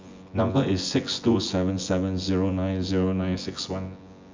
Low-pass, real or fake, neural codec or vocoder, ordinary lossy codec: 7.2 kHz; fake; vocoder, 24 kHz, 100 mel bands, Vocos; MP3, 64 kbps